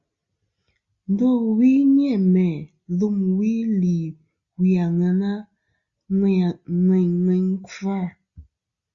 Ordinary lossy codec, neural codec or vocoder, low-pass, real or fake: Opus, 64 kbps; none; 7.2 kHz; real